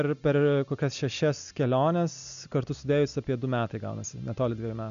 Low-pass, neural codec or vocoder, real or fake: 7.2 kHz; none; real